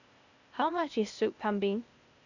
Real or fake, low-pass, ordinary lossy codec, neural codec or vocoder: fake; 7.2 kHz; none; codec, 16 kHz, 0.8 kbps, ZipCodec